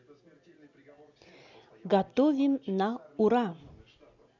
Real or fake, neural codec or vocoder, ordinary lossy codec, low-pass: real; none; none; 7.2 kHz